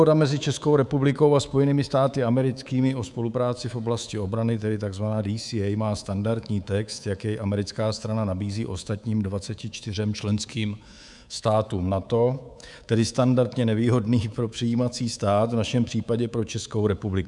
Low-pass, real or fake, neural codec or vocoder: 10.8 kHz; fake; codec, 24 kHz, 3.1 kbps, DualCodec